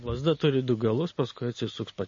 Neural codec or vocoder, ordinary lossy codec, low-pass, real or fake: none; MP3, 32 kbps; 7.2 kHz; real